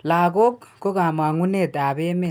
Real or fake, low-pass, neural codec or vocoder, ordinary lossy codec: real; none; none; none